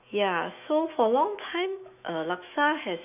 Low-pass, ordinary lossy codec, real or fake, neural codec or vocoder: 3.6 kHz; none; fake; autoencoder, 48 kHz, 128 numbers a frame, DAC-VAE, trained on Japanese speech